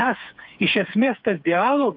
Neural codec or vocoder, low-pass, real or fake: codec, 16 kHz, 4 kbps, FreqCodec, smaller model; 5.4 kHz; fake